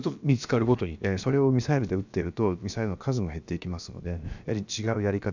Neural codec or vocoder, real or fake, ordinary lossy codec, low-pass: codec, 16 kHz, about 1 kbps, DyCAST, with the encoder's durations; fake; none; 7.2 kHz